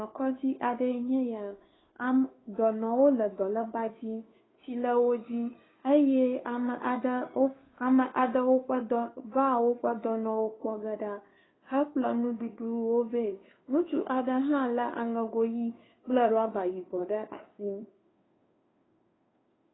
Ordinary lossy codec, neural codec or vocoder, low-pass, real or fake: AAC, 16 kbps; codec, 16 kHz, 2 kbps, FunCodec, trained on Chinese and English, 25 frames a second; 7.2 kHz; fake